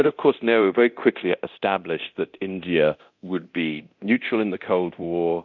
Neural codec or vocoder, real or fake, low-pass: codec, 24 kHz, 0.9 kbps, DualCodec; fake; 7.2 kHz